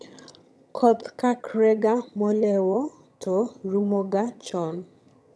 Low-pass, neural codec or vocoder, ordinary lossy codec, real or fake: none; vocoder, 22.05 kHz, 80 mel bands, HiFi-GAN; none; fake